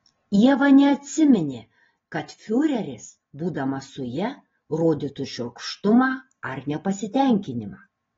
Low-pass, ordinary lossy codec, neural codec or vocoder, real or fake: 7.2 kHz; AAC, 24 kbps; none; real